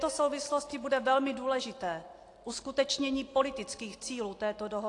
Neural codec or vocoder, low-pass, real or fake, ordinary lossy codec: none; 10.8 kHz; real; AAC, 48 kbps